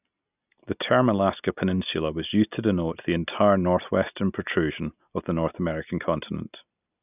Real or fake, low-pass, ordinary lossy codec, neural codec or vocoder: real; 3.6 kHz; none; none